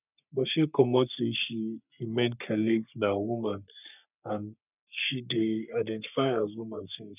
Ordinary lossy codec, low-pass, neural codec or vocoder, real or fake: none; 3.6 kHz; codec, 44.1 kHz, 3.4 kbps, Pupu-Codec; fake